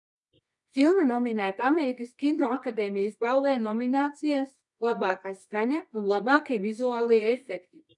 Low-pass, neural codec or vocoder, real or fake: 10.8 kHz; codec, 24 kHz, 0.9 kbps, WavTokenizer, medium music audio release; fake